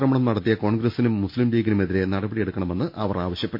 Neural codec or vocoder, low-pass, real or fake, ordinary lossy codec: none; 5.4 kHz; real; MP3, 32 kbps